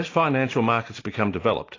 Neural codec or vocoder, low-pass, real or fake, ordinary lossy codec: none; 7.2 kHz; real; AAC, 32 kbps